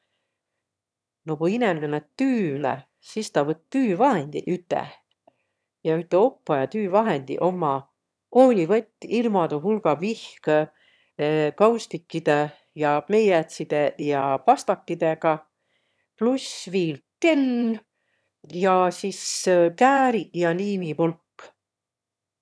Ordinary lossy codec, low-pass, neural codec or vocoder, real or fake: none; none; autoencoder, 22.05 kHz, a latent of 192 numbers a frame, VITS, trained on one speaker; fake